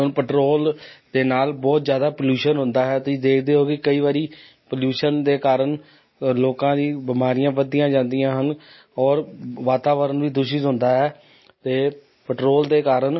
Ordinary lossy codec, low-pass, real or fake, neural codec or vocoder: MP3, 24 kbps; 7.2 kHz; real; none